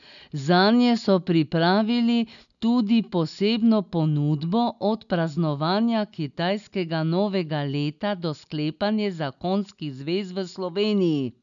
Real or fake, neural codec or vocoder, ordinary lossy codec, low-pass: real; none; none; 7.2 kHz